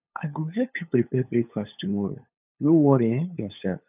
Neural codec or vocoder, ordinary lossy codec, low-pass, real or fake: codec, 16 kHz, 8 kbps, FunCodec, trained on LibriTTS, 25 frames a second; none; 3.6 kHz; fake